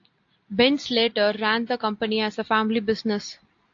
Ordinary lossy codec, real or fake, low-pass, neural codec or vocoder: AAC, 48 kbps; real; 7.2 kHz; none